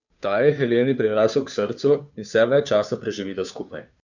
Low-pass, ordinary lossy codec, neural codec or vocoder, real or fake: 7.2 kHz; none; codec, 16 kHz, 2 kbps, FunCodec, trained on Chinese and English, 25 frames a second; fake